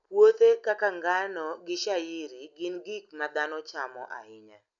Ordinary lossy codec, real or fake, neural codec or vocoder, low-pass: none; real; none; 7.2 kHz